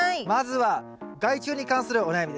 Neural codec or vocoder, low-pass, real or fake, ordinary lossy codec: none; none; real; none